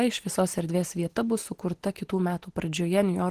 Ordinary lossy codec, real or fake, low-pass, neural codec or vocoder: Opus, 16 kbps; real; 14.4 kHz; none